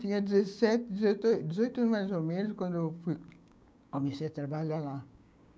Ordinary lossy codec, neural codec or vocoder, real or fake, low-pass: none; codec, 16 kHz, 6 kbps, DAC; fake; none